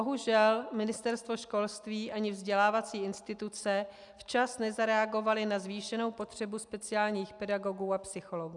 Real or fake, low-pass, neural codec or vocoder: real; 10.8 kHz; none